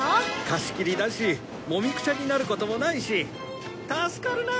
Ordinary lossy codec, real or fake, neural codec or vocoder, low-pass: none; real; none; none